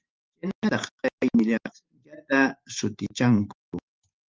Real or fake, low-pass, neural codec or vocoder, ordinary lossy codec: fake; 7.2 kHz; vocoder, 44.1 kHz, 128 mel bands every 512 samples, BigVGAN v2; Opus, 32 kbps